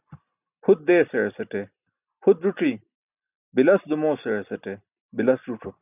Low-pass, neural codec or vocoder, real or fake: 3.6 kHz; none; real